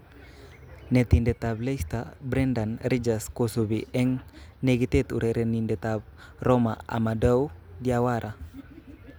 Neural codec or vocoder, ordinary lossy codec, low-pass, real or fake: none; none; none; real